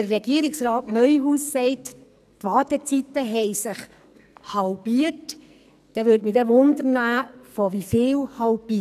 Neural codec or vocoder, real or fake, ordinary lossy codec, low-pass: codec, 44.1 kHz, 2.6 kbps, SNAC; fake; none; 14.4 kHz